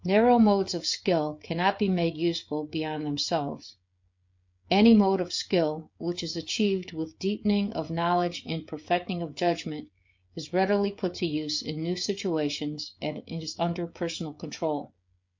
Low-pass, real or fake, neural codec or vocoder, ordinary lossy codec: 7.2 kHz; fake; codec, 16 kHz, 16 kbps, FreqCodec, smaller model; MP3, 48 kbps